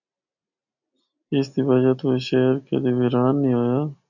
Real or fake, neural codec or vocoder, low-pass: real; none; 7.2 kHz